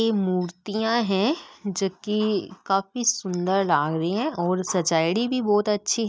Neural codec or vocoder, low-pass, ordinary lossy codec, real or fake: none; none; none; real